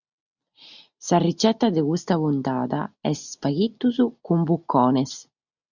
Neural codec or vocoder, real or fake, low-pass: none; real; 7.2 kHz